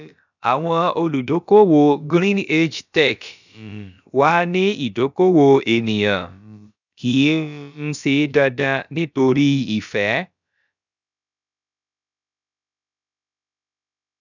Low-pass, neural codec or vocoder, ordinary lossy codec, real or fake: 7.2 kHz; codec, 16 kHz, about 1 kbps, DyCAST, with the encoder's durations; none; fake